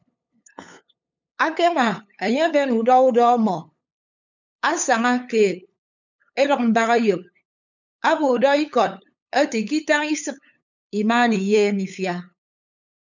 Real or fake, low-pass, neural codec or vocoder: fake; 7.2 kHz; codec, 16 kHz, 8 kbps, FunCodec, trained on LibriTTS, 25 frames a second